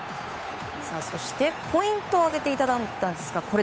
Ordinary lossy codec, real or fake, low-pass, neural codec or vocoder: none; fake; none; codec, 16 kHz, 8 kbps, FunCodec, trained on Chinese and English, 25 frames a second